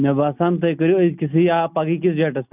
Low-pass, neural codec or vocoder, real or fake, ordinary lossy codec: 3.6 kHz; none; real; none